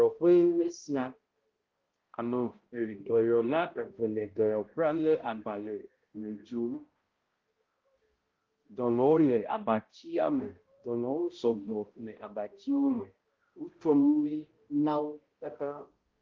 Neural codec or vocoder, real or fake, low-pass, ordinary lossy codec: codec, 16 kHz, 0.5 kbps, X-Codec, HuBERT features, trained on balanced general audio; fake; 7.2 kHz; Opus, 16 kbps